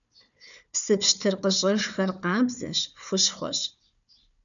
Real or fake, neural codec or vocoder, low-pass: fake; codec, 16 kHz, 4 kbps, FunCodec, trained on Chinese and English, 50 frames a second; 7.2 kHz